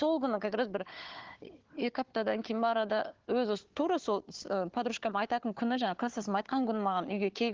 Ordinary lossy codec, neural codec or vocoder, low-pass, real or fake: Opus, 16 kbps; codec, 16 kHz, 4 kbps, FunCodec, trained on Chinese and English, 50 frames a second; 7.2 kHz; fake